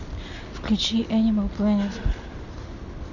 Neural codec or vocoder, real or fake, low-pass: none; real; 7.2 kHz